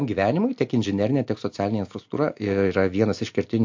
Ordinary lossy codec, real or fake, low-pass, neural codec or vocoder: MP3, 48 kbps; real; 7.2 kHz; none